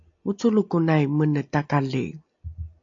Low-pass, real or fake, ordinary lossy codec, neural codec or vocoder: 7.2 kHz; real; AAC, 48 kbps; none